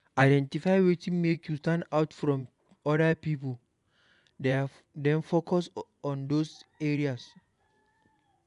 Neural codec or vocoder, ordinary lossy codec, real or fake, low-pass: vocoder, 24 kHz, 100 mel bands, Vocos; none; fake; 10.8 kHz